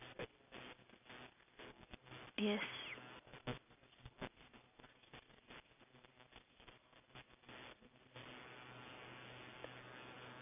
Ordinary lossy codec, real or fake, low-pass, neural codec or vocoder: none; real; 3.6 kHz; none